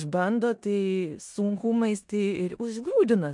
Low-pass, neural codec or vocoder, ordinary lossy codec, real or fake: 10.8 kHz; codec, 16 kHz in and 24 kHz out, 0.9 kbps, LongCat-Audio-Codec, four codebook decoder; MP3, 64 kbps; fake